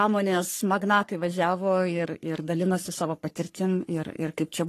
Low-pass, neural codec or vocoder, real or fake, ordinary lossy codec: 14.4 kHz; codec, 44.1 kHz, 3.4 kbps, Pupu-Codec; fake; AAC, 48 kbps